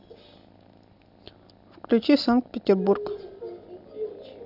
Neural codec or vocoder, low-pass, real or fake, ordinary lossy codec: none; 5.4 kHz; real; none